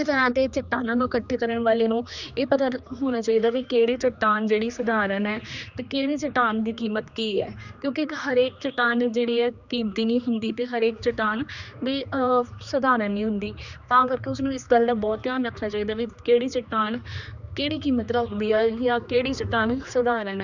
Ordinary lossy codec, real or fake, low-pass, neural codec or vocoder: none; fake; 7.2 kHz; codec, 16 kHz, 2 kbps, X-Codec, HuBERT features, trained on general audio